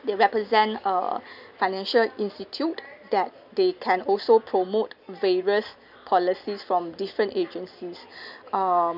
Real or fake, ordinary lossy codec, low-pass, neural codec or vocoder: real; none; 5.4 kHz; none